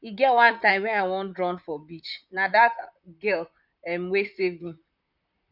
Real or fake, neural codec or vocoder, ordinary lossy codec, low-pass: fake; vocoder, 44.1 kHz, 128 mel bands, Pupu-Vocoder; none; 5.4 kHz